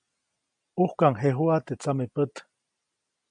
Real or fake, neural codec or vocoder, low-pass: real; none; 9.9 kHz